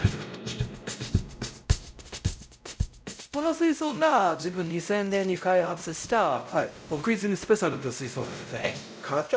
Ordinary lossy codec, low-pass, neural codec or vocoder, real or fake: none; none; codec, 16 kHz, 0.5 kbps, X-Codec, WavLM features, trained on Multilingual LibriSpeech; fake